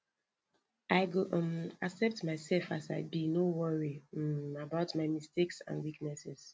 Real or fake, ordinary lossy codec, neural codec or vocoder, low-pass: real; none; none; none